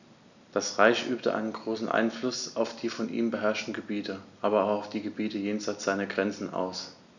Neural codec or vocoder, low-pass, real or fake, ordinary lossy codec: none; 7.2 kHz; real; none